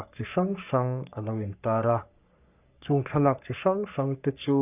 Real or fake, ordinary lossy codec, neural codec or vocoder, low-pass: fake; none; codec, 44.1 kHz, 3.4 kbps, Pupu-Codec; 3.6 kHz